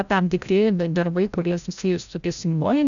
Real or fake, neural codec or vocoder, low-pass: fake; codec, 16 kHz, 0.5 kbps, FreqCodec, larger model; 7.2 kHz